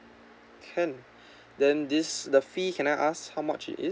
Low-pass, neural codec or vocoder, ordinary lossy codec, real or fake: none; none; none; real